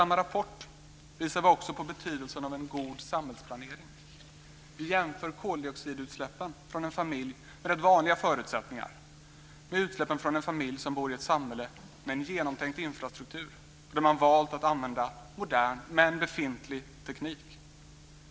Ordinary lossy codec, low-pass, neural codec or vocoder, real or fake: none; none; none; real